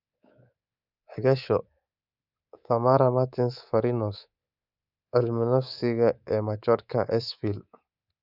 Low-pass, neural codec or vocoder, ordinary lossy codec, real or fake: 5.4 kHz; codec, 24 kHz, 3.1 kbps, DualCodec; none; fake